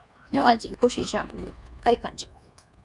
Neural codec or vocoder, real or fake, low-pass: codec, 24 kHz, 1.2 kbps, DualCodec; fake; 10.8 kHz